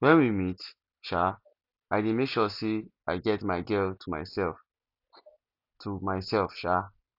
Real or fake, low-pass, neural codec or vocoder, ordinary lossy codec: real; 5.4 kHz; none; none